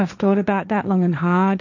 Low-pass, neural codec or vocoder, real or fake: 7.2 kHz; codec, 16 kHz, 1.1 kbps, Voila-Tokenizer; fake